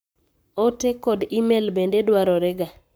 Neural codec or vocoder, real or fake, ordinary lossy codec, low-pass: codec, 44.1 kHz, 7.8 kbps, Pupu-Codec; fake; none; none